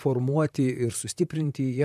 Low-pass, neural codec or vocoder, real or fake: 14.4 kHz; vocoder, 44.1 kHz, 128 mel bands, Pupu-Vocoder; fake